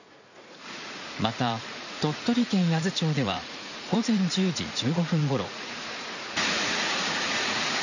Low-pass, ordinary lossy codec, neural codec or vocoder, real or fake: 7.2 kHz; none; vocoder, 44.1 kHz, 80 mel bands, Vocos; fake